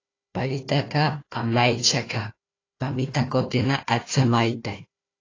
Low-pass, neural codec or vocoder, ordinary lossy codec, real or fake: 7.2 kHz; codec, 16 kHz, 1 kbps, FunCodec, trained on Chinese and English, 50 frames a second; AAC, 32 kbps; fake